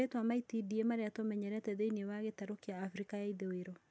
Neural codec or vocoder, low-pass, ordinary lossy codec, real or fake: none; none; none; real